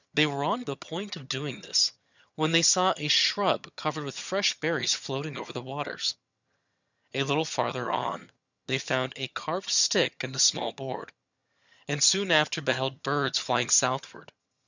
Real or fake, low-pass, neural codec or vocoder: fake; 7.2 kHz; vocoder, 22.05 kHz, 80 mel bands, HiFi-GAN